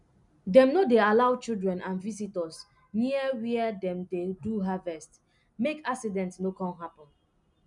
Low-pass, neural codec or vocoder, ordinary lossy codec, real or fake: 10.8 kHz; none; none; real